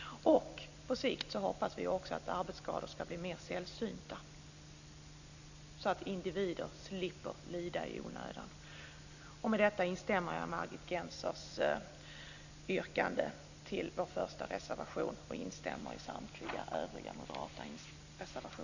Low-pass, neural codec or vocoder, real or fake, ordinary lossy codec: 7.2 kHz; none; real; none